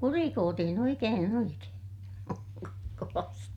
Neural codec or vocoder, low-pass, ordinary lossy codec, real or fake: vocoder, 44.1 kHz, 128 mel bands every 512 samples, BigVGAN v2; 19.8 kHz; none; fake